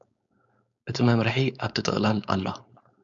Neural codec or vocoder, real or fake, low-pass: codec, 16 kHz, 4.8 kbps, FACodec; fake; 7.2 kHz